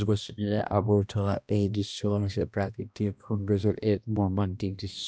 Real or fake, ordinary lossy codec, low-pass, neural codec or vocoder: fake; none; none; codec, 16 kHz, 1 kbps, X-Codec, HuBERT features, trained on balanced general audio